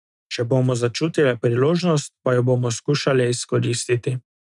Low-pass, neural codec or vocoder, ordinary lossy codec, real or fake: 10.8 kHz; none; none; real